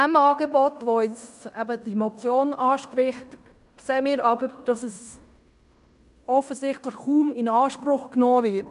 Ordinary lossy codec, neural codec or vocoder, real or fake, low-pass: none; codec, 16 kHz in and 24 kHz out, 0.9 kbps, LongCat-Audio-Codec, fine tuned four codebook decoder; fake; 10.8 kHz